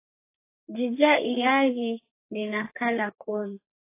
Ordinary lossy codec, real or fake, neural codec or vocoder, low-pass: AAC, 24 kbps; fake; codec, 32 kHz, 1.9 kbps, SNAC; 3.6 kHz